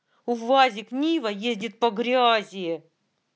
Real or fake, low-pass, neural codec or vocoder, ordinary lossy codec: real; none; none; none